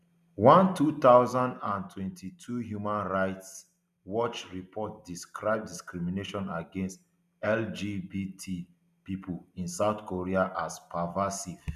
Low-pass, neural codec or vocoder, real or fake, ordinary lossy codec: 14.4 kHz; none; real; none